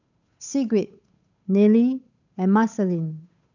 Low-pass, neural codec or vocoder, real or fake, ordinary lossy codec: 7.2 kHz; codec, 16 kHz, 8 kbps, FunCodec, trained on Chinese and English, 25 frames a second; fake; none